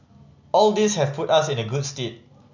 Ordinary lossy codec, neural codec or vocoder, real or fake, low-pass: none; none; real; 7.2 kHz